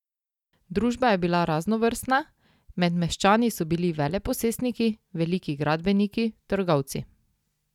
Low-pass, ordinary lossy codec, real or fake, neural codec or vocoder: 19.8 kHz; none; real; none